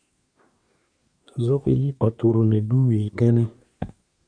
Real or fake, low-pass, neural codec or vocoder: fake; 9.9 kHz; codec, 24 kHz, 1 kbps, SNAC